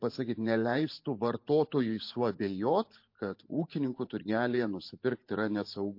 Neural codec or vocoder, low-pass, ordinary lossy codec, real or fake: vocoder, 44.1 kHz, 128 mel bands every 512 samples, BigVGAN v2; 5.4 kHz; MP3, 32 kbps; fake